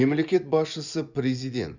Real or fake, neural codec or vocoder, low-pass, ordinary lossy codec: fake; vocoder, 24 kHz, 100 mel bands, Vocos; 7.2 kHz; Opus, 64 kbps